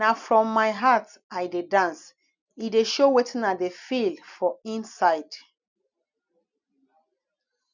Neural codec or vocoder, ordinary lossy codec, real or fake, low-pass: none; none; real; 7.2 kHz